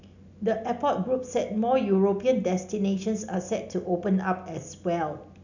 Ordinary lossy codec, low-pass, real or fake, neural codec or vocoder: none; 7.2 kHz; real; none